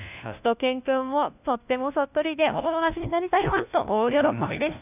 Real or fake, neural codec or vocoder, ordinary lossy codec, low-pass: fake; codec, 16 kHz, 1 kbps, FunCodec, trained on LibriTTS, 50 frames a second; none; 3.6 kHz